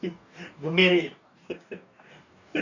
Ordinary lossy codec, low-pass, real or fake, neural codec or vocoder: none; 7.2 kHz; fake; codec, 44.1 kHz, 2.6 kbps, DAC